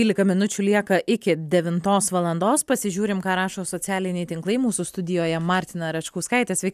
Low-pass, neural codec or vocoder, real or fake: 14.4 kHz; none; real